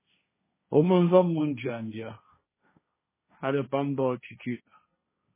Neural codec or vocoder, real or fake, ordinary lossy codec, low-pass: codec, 16 kHz, 1.1 kbps, Voila-Tokenizer; fake; MP3, 16 kbps; 3.6 kHz